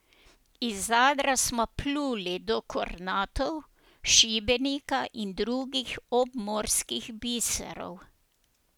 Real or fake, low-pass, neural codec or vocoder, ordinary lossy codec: real; none; none; none